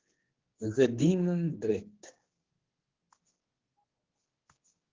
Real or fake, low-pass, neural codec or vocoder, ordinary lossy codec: fake; 7.2 kHz; codec, 16 kHz, 2 kbps, X-Codec, HuBERT features, trained on general audio; Opus, 16 kbps